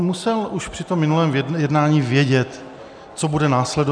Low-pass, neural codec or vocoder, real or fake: 9.9 kHz; none; real